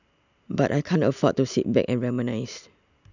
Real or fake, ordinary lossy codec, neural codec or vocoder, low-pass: real; none; none; 7.2 kHz